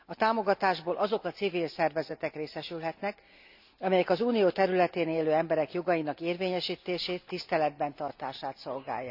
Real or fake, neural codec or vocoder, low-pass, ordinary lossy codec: real; none; 5.4 kHz; none